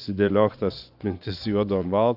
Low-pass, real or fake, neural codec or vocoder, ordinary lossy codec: 5.4 kHz; fake; vocoder, 24 kHz, 100 mel bands, Vocos; AAC, 48 kbps